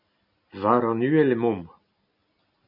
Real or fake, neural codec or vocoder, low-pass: real; none; 5.4 kHz